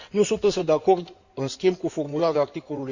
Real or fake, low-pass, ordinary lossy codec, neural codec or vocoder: fake; 7.2 kHz; Opus, 64 kbps; codec, 16 kHz in and 24 kHz out, 2.2 kbps, FireRedTTS-2 codec